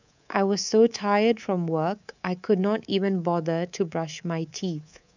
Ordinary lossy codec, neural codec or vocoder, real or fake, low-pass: none; codec, 24 kHz, 3.1 kbps, DualCodec; fake; 7.2 kHz